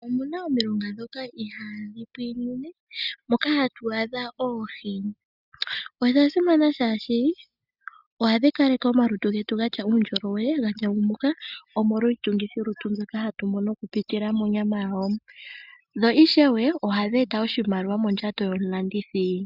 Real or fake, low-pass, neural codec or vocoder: real; 5.4 kHz; none